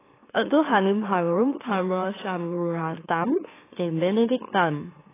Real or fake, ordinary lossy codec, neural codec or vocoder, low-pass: fake; AAC, 16 kbps; autoencoder, 44.1 kHz, a latent of 192 numbers a frame, MeloTTS; 3.6 kHz